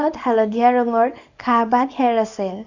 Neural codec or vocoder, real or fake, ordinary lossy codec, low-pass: codec, 24 kHz, 0.9 kbps, WavTokenizer, small release; fake; none; 7.2 kHz